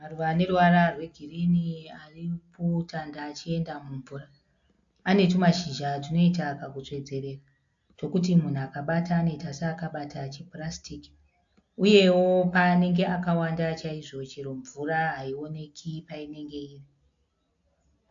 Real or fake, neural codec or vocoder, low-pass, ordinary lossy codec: real; none; 7.2 kHz; AAC, 64 kbps